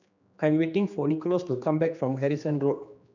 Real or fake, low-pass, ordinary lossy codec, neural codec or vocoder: fake; 7.2 kHz; none; codec, 16 kHz, 2 kbps, X-Codec, HuBERT features, trained on general audio